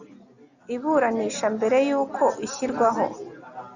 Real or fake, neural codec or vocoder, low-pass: real; none; 7.2 kHz